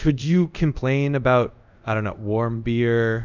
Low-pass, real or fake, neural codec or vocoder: 7.2 kHz; fake; codec, 24 kHz, 0.5 kbps, DualCodec